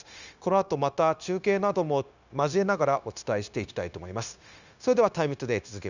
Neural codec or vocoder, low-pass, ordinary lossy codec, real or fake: codec, 16 kHz, 0.9 kbps, LongCat-Audio-Codec; 7.2 kHz; none; fake